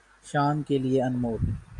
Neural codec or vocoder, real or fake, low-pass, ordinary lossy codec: none; real; 10.8 kHz; Opus, 64 kbps